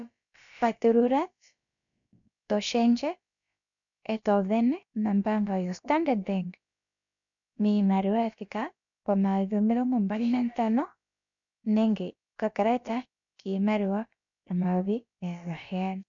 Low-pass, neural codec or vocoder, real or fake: 7.2 kHz; codec, 16 kHz, about 1 kbps, DyCAST, with the encoder's durations; fake